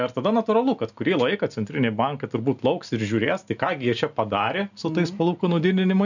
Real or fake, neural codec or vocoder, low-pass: real; none; 7.2 kHz